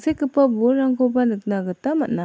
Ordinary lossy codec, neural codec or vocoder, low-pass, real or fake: none; none; none; real